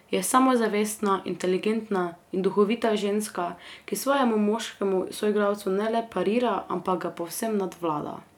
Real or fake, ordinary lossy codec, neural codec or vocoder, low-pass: real; none; none; 19.8 kHz